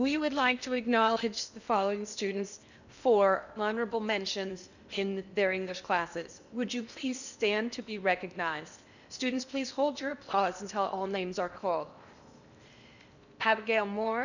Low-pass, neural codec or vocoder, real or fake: 7.2 kHz; codec, 16 kHz in and 24 kHz out, 0.8 kbps, FocalCodec, streaming, 65536 codes; fake